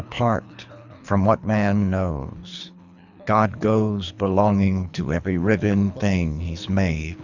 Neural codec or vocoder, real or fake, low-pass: codec, 24 kHz, 3 kbps, HILCodec; fake; 7.2 kHz